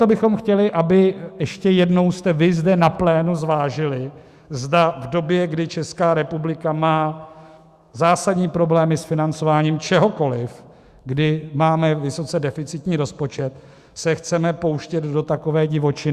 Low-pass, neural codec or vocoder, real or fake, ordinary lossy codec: 14.4 kHz; autoencoder, 48 kHz, 128 numbers a frame, DAC-VAE, trained on Japanese speech; fake; Opus, 64 kbps